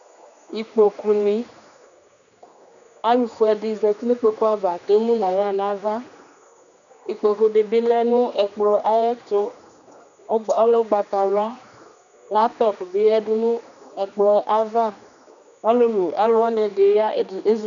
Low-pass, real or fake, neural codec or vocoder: 7.2 kHz; fake; codec, 16 kHz, 2 kbps, X-Codec, HuBERT features, trained on general audio